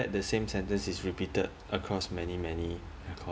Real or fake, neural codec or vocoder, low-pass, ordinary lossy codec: real; none; none; none